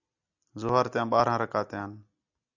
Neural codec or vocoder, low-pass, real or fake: none; 7.2 kHz; real